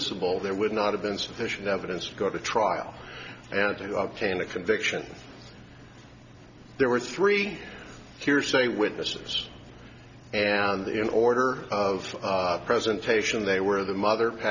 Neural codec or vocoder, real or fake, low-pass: none; real; 7.2 kHz